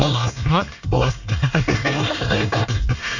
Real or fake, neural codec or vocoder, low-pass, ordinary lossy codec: fake; codec, 24 kHz, 1 kbps, SNAC; 7.2 kHz; none